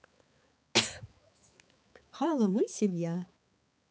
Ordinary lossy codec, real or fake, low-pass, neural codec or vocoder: none; fake; none; codec, 16 kHz, 4 kbps, X-Codec, HuBERT features, trained on balanced general audio